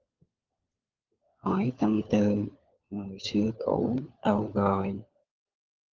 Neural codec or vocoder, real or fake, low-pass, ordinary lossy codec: codec, 16 kHz, 4 kbps, FunCodec, trained on LibriTTS, 50 frames a second; fake; 7.2 kHz; Opus, 24 kbps